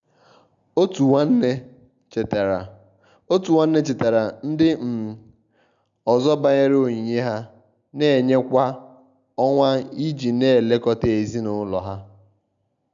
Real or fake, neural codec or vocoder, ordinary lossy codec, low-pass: real; none; none; 7.2 kHz